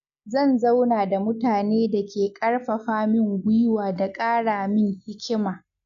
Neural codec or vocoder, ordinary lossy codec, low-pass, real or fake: none; none; 7.2 kHz; real